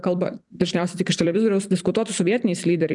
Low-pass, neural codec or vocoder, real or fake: 10.8 kHz; vocoder, 44.1 kHz, 128 mel bands every 256 samples, BigVGAN v2; fake